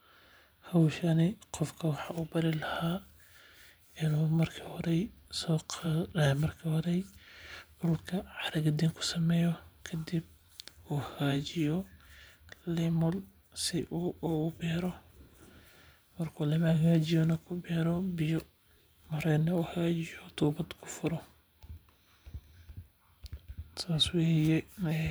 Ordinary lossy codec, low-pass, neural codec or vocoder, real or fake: none; none; vocoder, 44.1 kHz, 128 mel bands every 512 samples, BigVGAN v2; fake